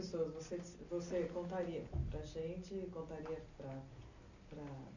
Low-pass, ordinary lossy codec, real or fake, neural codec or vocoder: 7.2 kHz; none; real; none